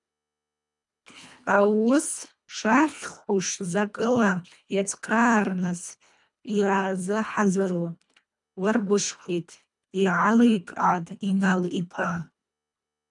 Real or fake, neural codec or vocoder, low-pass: fake; codec, 24 kHz, 1.5 kbps, HILCodec; 10.8 kHz